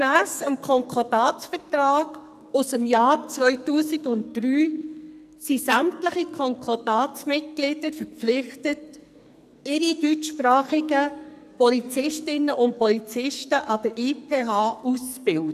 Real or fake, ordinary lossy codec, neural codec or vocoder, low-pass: fake; none; codec, 44.1 kHz, 2.6 kbps, SNAC; 14.4 kHz